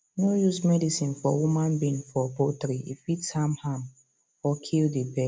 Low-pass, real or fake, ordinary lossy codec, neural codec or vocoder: none; real; none; none